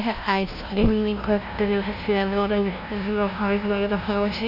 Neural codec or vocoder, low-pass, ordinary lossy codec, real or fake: codec, 16 kHz, 0.5 kbps, FunCodec, trained on LibriTTS, 25 frames a second; 5.4 kHz; none; fake